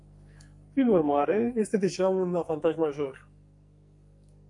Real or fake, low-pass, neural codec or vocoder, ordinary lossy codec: fake; 10.8 kHz; codec, 44.1 kHz, 2.6 kbps, SNAC; AAC, 64 kbps